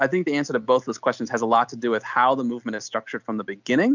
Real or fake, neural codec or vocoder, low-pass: real; none; 7.2 kHz